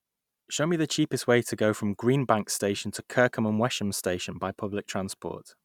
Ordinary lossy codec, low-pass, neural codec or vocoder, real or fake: none; 19.8 kHz; none; real